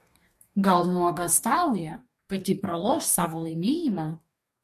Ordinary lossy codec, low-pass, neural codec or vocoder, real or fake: MP3, 64 kbps; 14.4 kHz; codec, 44.1 kHz, 2.6 kbps, DAC; fake